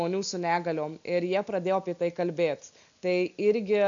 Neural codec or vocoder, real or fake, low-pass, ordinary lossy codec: none; real; 7.2 kHz; MP3, 96 kbps